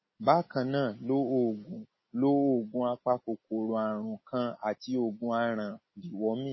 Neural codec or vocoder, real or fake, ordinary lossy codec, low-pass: none; real; MP3, 24 kbps; 7.2 kHz